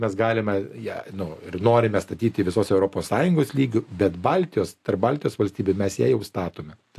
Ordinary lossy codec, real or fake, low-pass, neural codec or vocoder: AAC, 64 kbps; real; 14.4 kHz; none